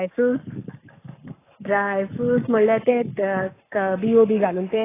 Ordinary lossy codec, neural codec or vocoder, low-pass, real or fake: AAC, 16 kbps; codec, 44.1 kHz, 7.8 kbps, Pupu-Codec; 3.6 kHz; fake